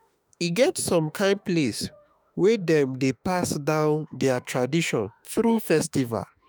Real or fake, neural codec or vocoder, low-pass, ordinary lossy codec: fake; autoencoder, 48 kHz, 32 numbers a frame, DAC-VAE, trained on Japanese speech; none; none